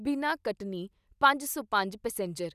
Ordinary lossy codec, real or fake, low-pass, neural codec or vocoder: none; real; 14.4 kHz; none